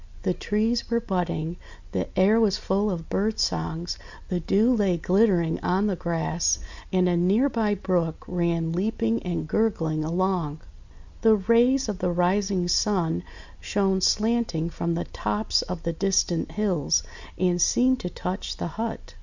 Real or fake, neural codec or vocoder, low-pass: real; none; 7.2 kHz